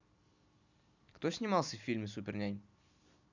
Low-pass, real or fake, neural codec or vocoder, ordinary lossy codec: 7.2 kHz; real; none; none